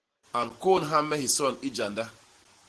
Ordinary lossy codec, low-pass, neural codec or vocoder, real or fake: Opus, 16 kbps; 10.8 kHz; none; real